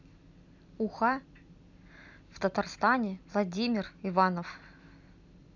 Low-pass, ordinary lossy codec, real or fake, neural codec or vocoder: 7.2 kHz; none; real; none